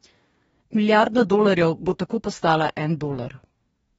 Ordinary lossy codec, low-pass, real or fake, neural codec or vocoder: AAC, 24 kbps; 19.8 kHz; fake; codec, 44.1 kHz, 2.6 kbps, DAC